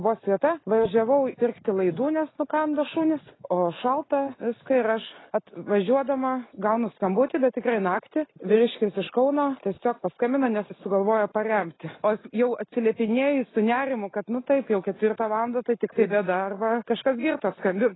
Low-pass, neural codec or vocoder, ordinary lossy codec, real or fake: 7.2 kHz; none; AAC, 16 kbps; real